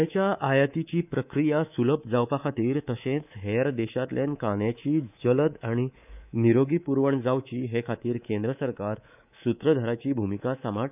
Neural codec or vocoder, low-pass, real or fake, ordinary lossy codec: codec, 24 kHz, 3.1 kbps, DualCodec; 3.6 kHz; fake; none